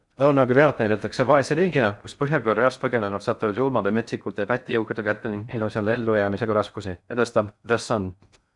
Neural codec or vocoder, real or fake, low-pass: codec, 16 kHz in and 24 kHz out, 0.6 kbps, FocalCodec, streaming, 4096 codes; fake; 10.8 kHz